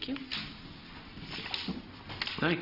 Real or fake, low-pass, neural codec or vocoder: real; 5.4 kHz; none